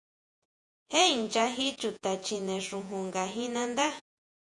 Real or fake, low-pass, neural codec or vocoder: fake; 10.8 kHz; vocoder, 48 kHz, 128 mel bands, Vocos